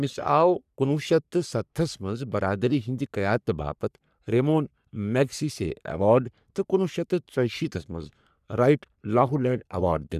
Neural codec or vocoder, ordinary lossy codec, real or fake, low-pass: codec, 44.1 kHz, 3.4 kbps, Pupu-Codec; none; fake; 14.4 kHz